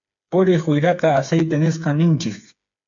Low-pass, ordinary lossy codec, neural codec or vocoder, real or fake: 7.2 kHz; MP3, 64 kbps; codec, 16 kHz, 4 kbps, FreqCodec, smaller model; fake